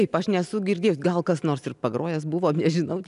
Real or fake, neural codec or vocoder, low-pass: real; none; 10.8 kHz